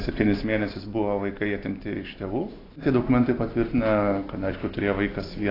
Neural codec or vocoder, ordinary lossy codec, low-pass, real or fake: none; AAC, 24 kbps; 5.4 kHz; real